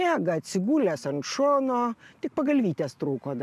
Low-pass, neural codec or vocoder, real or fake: 14.4 kHz; none; real